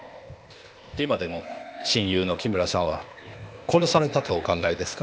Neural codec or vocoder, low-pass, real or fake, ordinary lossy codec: codec, 16 kHz, 0.8 kbps, ZipCodec; none; fake; none